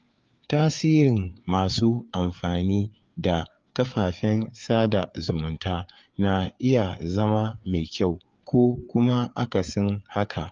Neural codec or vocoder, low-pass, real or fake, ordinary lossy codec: codec, 16 kHz, 4 kbps, FreqCodec, larger model; 7.2 kHz; fake; Opus, 24 kbps